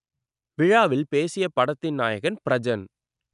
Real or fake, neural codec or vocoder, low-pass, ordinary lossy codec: real; none; 10.8 kHz; none